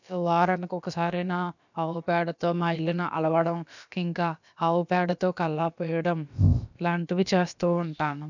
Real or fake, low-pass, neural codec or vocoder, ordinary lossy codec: fake; 7.2 kHz; codec, 16 kHz, about 1 kbps, DyCAST, with the encoder's durations; none